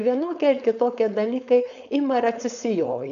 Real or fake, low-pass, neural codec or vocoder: fake; 7.2 kHz; codec, 16 kHz, 4.8 kbps, FACodec